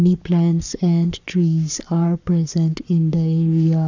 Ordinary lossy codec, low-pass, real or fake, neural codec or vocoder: none; 7.2 kHz; fake; codec, 44.1 kHz, 7.8 kbps, Pupu-Codec